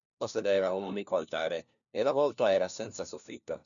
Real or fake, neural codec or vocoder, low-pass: fake; codec, 16 kHz, 1 kbps, FunCodec, trained on LibriTTS, 50 frames a second; 7.2 kHz